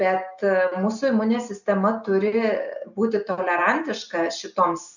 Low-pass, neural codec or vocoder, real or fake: 7.2 kHz; none; real